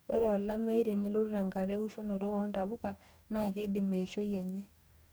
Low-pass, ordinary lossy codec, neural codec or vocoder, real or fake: none; none; codec, 44.1 kHz, 2.6 kbps, DAC; fake